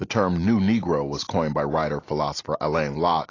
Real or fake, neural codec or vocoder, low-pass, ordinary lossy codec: real; none; 7.2 kHz; AAC, 32 kbps